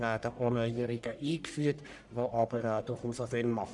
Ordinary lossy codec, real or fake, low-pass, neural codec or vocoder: none; fake; 10.8 kHz; codec, 44.1 kHz, 1.7 kbps, Pupu-Codec